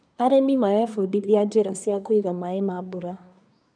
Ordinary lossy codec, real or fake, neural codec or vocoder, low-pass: none; fake; codec, 24 kHz, 1 kbps, SNAC; 9.9 kHz